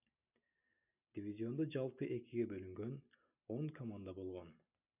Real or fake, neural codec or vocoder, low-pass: real; none; 3.6 kHz